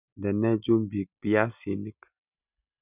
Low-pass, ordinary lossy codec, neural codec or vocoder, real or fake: 3.6 kHz; none; none; real